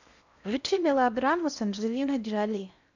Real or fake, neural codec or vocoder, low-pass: fake; codec, 16 kHz in and 24 kHz out, 0.6 kbps, FocalCodec, streaming, 4096 codes; 7.2 kHz